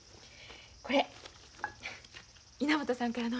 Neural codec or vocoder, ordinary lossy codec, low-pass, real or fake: none; none; none; real